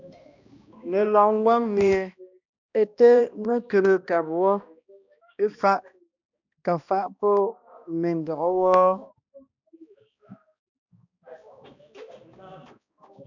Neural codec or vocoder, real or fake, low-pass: codec, 16 kHz, 1 kbps, X-Codec, HuBERT features, trained on balanced general audio; fake; 7.2 kHz